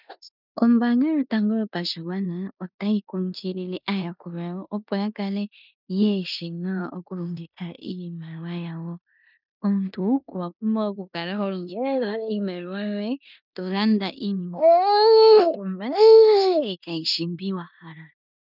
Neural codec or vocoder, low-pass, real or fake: codec, 16 kHz in and 24 kHz out, 0.9 kbps, LongCat-Audio-Codec, four codebook decoder; 5.4 kHz; fake